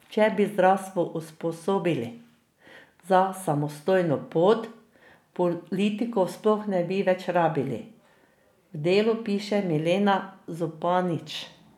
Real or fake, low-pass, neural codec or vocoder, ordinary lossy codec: real; 19.8 kHz; none; none